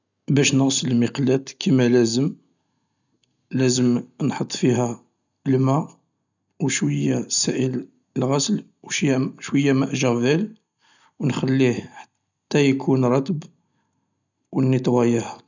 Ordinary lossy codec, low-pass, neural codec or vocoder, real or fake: none; 7.2 kHz; none; real